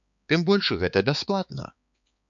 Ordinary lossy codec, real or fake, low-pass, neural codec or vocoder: MP3, 64 kbps; fake; 7.2 kHz; codec, 16 kHz, 4 kbps, X-Codec, HuBERT features, trained on balanced general audio